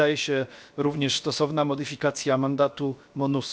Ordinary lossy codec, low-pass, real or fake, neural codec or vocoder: none; none; fake; codec, 16 kHz, 0.7 kbps, FocalCodec